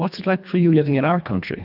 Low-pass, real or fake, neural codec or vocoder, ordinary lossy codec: 5.4 kHz; fake; codec, 24 kHz, 1.5 kbps, HILCodec; AAC, 48 kbps